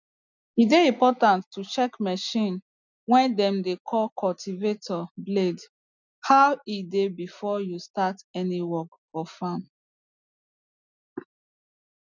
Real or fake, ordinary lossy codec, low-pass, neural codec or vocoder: real; none; 7.2 kHz; none